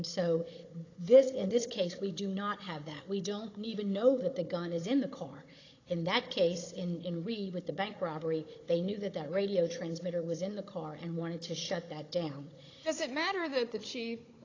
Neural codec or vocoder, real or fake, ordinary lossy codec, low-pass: codec, 16 kHz, 16 kbps, FunCodec, trained on Chinese and English, 50 frames a second; fake; AAC, 32 kbps; 7.2 kHz